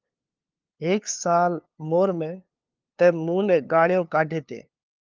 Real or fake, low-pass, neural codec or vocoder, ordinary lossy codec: fake; 7.2 kHz; codec, 16 kHz, 2 kbps, FunCodec, trained on LibriTTS, 25 frames a second; Opus, 32 kbps